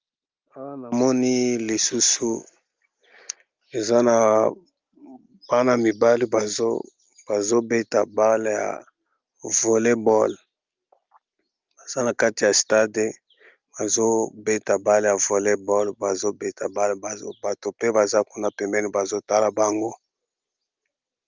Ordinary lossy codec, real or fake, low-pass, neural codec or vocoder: Opus, 32 kbps; real; 7.2 kHz; none